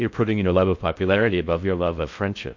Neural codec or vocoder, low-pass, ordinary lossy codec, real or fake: codec, 16 kHz in and 24 kHz out, 0.6 kbps, FocalCodec, streaming, 2048 codes; 7.2 kHz; MP3, 48 kbps; fake